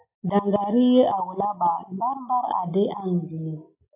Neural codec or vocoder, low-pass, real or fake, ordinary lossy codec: none; 3.6 kHz; real; AAC, 32 kbps